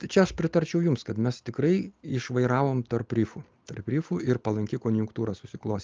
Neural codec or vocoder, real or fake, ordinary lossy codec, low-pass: none; real; Opus, 24 kbps; 7.2 kHz